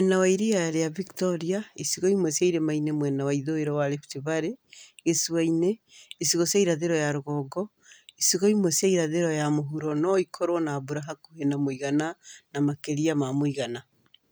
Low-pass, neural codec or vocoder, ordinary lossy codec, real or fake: none; none; none; real